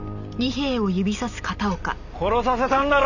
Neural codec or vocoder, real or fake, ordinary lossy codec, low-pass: none; real; none; 7.2 kHz